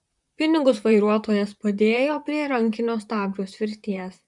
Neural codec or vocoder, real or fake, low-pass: vocoder, 44.1 kHz, 128 mel bands, Pupu-Vocoder; fake; 10.8 kHz